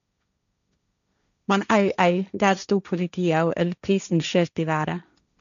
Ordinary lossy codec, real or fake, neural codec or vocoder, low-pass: MP3, 96 kbps; fake; codec, 16 kHz, 1.1 kbps, Voila-Tokenizer; 7.2 kHz